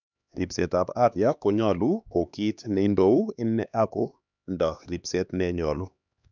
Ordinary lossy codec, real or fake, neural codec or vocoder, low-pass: none; fake; codec, 16 kHz, 2 kbps, X-Codec, HuBERT features, trained on LibriSpeech; 7.2 kHz